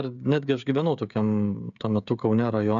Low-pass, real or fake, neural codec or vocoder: 7.2 kHz; fake; codec, 16 kHz, 16 kbps, FreqCodec, smaller model